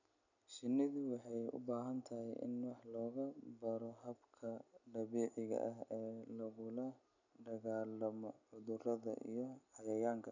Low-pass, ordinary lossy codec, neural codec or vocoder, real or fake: 7.2 kHz; none; none; real